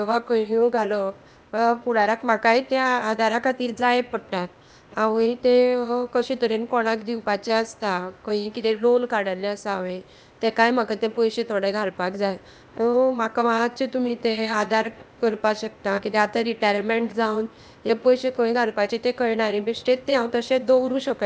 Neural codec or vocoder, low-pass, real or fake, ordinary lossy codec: codec, 16 kHz, 0.8 kbps, ZipCodec; none; fake; none